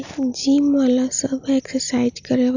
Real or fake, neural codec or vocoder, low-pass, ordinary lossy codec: real; none; 7.2 kHz; none